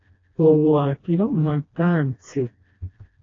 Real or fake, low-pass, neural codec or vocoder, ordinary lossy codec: fake; 7.2 kHz; codec, 16 kHz, 1 kbps, FreqCodec, smaller model; AAC, 32 kbps